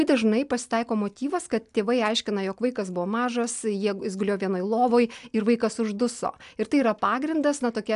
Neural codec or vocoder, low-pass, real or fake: none; 10.8 kHz; real